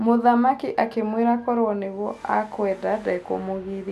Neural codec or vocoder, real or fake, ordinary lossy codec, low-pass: none; real; none; 14.4 kHz